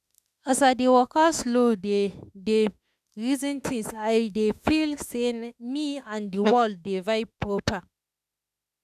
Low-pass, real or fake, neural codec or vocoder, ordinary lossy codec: 14.4 kHz; fake; autoencoder, 48 kHz, 32 numbers a frame, DAC-VAE, trained on Japanese speech; none